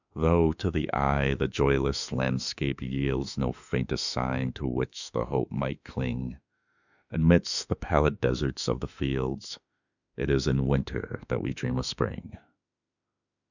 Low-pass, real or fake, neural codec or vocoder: 7.2 kHz; fake; autoencoder, 48 kHz, 32 numbers a frame, DAC-VAE, trained on Japanese speech